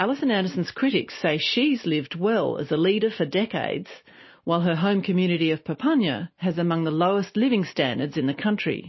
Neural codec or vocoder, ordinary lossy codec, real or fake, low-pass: none; MP3, 24 kbps; real; 7.2 kHz